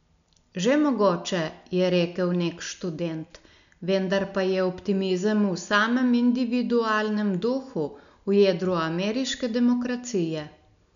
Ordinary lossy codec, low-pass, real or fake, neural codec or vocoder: none; 7.2 kHz; real; none